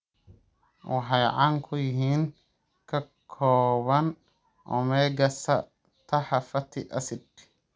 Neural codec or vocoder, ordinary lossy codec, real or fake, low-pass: none; none; real; none